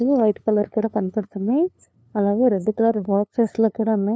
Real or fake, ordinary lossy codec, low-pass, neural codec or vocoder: fake; none; none; codec, 16 kHz, 2 kbps, FunCodec, trained on LibriTTS, 25 frames a second